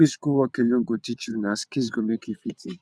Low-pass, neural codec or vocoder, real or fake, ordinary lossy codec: none; vocoder, 22.05 kHz, 80 mel bands, WaveNeXt; fake; none